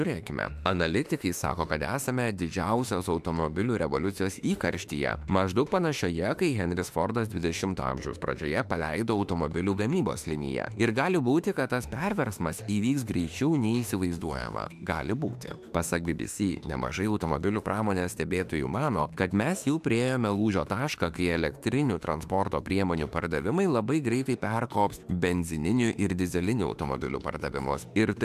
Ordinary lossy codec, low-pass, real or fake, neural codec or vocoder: AAC, 96 kbps; 14.4 kHz; fake; autoencoder, 48 kHz, 32 numbers a frame, DAC-VAE, trained on Japanese speech